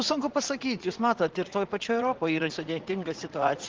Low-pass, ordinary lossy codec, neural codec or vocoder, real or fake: 7.2 kHz; Opus, 16 kbps; vocoder, 44.1 kHz, 80 mel bands, Vocos; fake